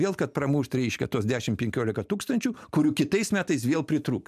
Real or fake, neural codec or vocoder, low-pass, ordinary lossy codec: real; none; 14.4 kHz; MP3, 96 kbps